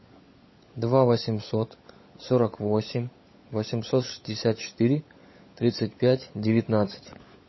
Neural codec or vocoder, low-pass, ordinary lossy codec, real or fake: codec, 16 kHz, 16 kbps, FunCodec, trained on LibriTTS, 50 frames a second; 7.2 kHz; MP3, 24 kbps; fake